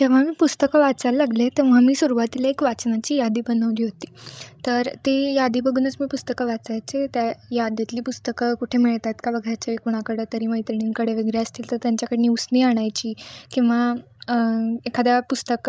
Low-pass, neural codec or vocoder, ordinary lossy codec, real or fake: none; codec, 16 kHz, 16 kbps, FreqCodec, larger model; none; fake